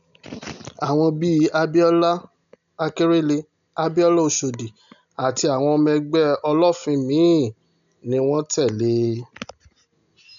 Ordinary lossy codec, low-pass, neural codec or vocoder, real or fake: none; 7.2 kHz; none; real